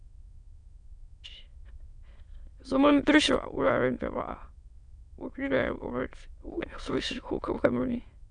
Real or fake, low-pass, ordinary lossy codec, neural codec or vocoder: fake; 9.9 kHz; MP3, 96 kbps; autoencoder, 22.05 kHz, a latent of 192 numbers a frame, VITS, trained on many speakers